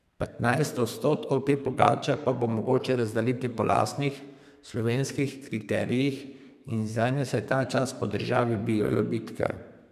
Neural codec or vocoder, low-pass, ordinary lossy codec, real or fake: codec, 32 kHz, 1.9 kbps, SNAC; 14.4 kHz; none; fake